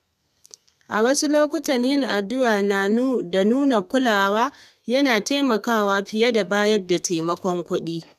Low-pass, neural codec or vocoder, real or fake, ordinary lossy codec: 14.4 kHz; codec, 32 kHz, 1.9 kbps, SNAC; fake; none